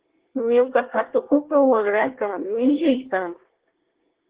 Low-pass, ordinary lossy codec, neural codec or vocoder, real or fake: 3.6 kHz; Opus, 16 kbps; codec, 24 kHz, 1 kbps, SNAC; fake